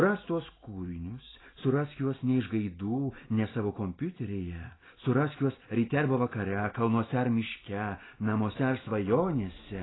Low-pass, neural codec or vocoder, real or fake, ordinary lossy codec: 7.2 kHz; none; real; AAC, 16 kbps